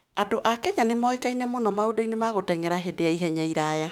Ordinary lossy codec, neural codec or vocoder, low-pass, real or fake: none; autoencoder, 48 kHz, 32 numbers a frame, DAC-VAE, trained on Japanese speech; 19.8 kHz; fake